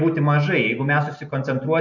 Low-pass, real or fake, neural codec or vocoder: 7.2 kHz; real; none